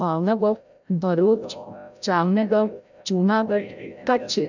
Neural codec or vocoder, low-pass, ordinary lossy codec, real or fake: codec, 16 kHz, 0.5 kbps, FreqCodec, larger model; 7.2 kHz; none; fake